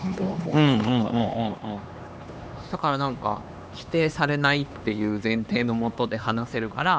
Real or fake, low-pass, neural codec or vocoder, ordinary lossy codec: fake; none; codec, 16 kHz, 2 kbps, X-Codec, HuBERT features, trained on LibriSpeech; none